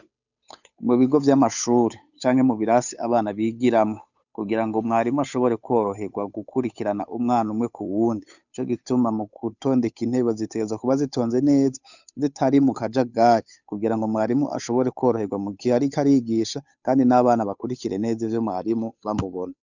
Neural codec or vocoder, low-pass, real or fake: codec, 16 kHz, 8 kbps, FunCodec, trained on Chinese and English, 25 frames a second; 7.2 kHz; fake